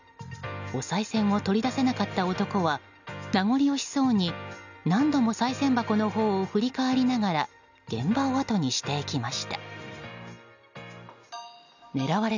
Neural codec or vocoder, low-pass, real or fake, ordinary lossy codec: none; 7.2 kHz; real; none